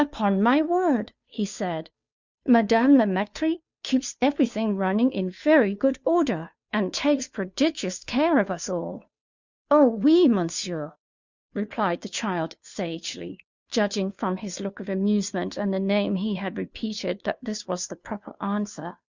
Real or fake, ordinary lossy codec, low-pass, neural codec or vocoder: fake; Opus, 64 kbps; 7.2 kHz; codec, 16 kHz, 2 kbps, FunCodec, trained on Chinese and English, 25 frames a second